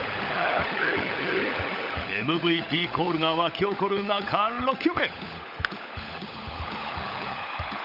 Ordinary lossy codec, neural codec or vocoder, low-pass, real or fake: none; codec, 16 kHz, 16 kbps, FunCodec, trained on LibriTTS, 50 frames a second; 5.4 kHz; fake